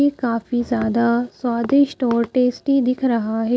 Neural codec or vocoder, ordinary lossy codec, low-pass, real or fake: none; none; none; real